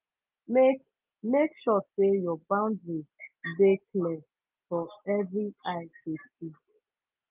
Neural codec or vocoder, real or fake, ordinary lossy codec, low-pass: none; real; Opus, 32 kbps; 3.6 kHz